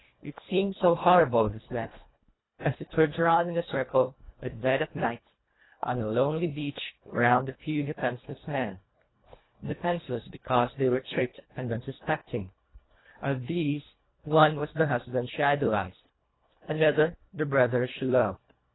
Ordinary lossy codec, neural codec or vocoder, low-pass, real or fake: AAC, 16 kbps; codec, 24 kHz, 1.5 kbps, HILCodec; 7.2 kHz; fake